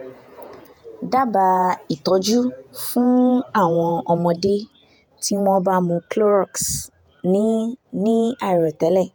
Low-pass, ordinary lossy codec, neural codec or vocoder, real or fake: none; none; vocoder, 48 kHz, 128 mel bands, Vocos; fake